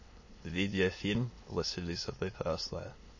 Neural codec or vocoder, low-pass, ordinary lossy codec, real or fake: autoencoder, 22.05 kHz, a latent of 192 numbers a frame, VITS, trained on many speakers; 7.2 kHz; MP3, 32 kbps; fake